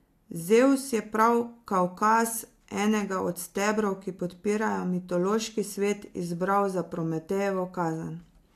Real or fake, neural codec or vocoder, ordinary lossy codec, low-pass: real; none; AAC, 64 kbps; 14.4 kHz